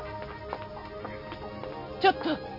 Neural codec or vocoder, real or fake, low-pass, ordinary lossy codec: none; real; 5.4 kHz; none